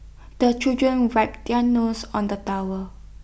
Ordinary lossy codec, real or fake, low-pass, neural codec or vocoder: none; real; none; none